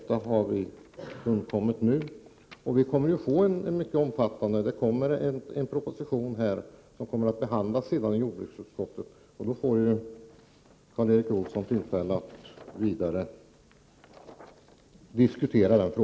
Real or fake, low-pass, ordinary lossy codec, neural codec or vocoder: real; none; none; none